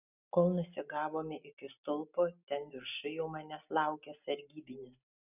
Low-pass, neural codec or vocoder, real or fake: 3.6 kHz; none; real